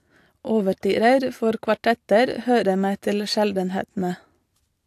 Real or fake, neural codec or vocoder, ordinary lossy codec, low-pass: real; none; AAC, 64 kbps; 14.4 kHz